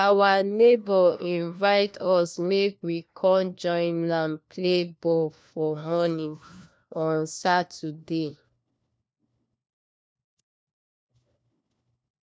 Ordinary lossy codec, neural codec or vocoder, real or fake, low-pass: none; codec, 16 kHz, 1 kbps, FunCodec, trained on LibriTTS, 50 frames a second; fake; none